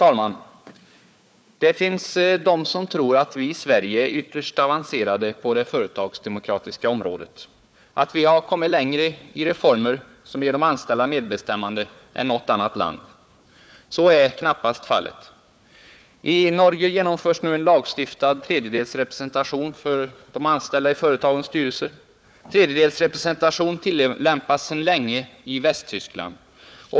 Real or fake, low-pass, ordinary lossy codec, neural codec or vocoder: fake; none; none; codec, 16 kHz, 4 kbps, FunCodec, trained on Chinese and English, 50 frames a second